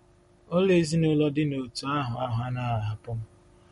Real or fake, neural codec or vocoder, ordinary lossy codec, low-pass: real; none; MP3, 48 kbps; 19.8 kHz